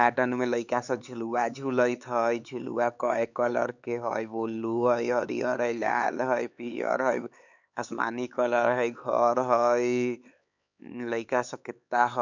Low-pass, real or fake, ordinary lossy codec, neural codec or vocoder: 7.2 kHz; fake; none; codec, 16 kHz, 4 kbps, X-Codec, HuBERT features, trained on LibriSpeech